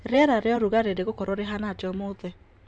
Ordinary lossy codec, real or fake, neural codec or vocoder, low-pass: none; fake; vocoder, 44.1 kHz, 128 mel bands every 512 samples, BigVGAN v2; 9.9 kHz